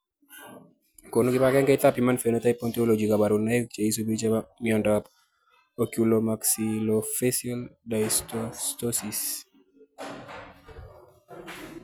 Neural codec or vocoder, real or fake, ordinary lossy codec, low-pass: none; real; none; none